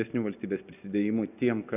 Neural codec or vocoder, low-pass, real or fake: none; 3.6 kHz; real